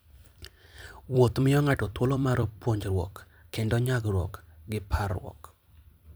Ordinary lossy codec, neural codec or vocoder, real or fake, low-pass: none; vocoder, 44.1 kHz, 128 mel bands every 256 samples, BigVGAN v2; fake; none